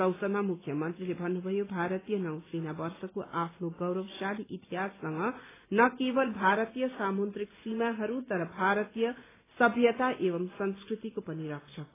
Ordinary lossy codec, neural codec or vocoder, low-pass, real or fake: AAC, 16 kbps; none; 3.6 kHz; real